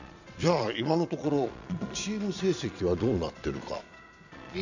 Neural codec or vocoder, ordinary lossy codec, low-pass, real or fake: vocoder, 22.05 kHz, 80 mel bands, Vocos; none; 7.2 kHz; fake